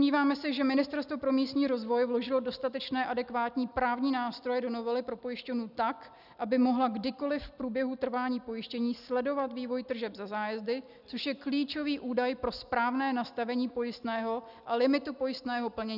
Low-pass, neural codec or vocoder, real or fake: 5.4 kHz; none; real